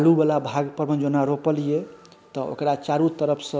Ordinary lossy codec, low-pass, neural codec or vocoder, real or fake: none; none; none; real